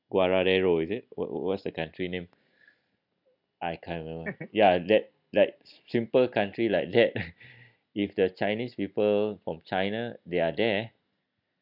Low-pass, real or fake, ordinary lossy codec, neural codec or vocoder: 5.4 kHz; real; none; none